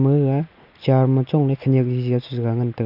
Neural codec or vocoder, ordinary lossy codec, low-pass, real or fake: none; none; 5.4 kHz; real